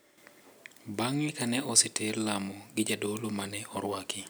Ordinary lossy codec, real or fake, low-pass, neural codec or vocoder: none; real; none; none